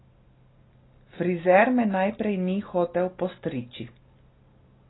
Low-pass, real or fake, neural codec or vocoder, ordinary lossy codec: 7.2 kHz; real; none; AAC, 16 kbps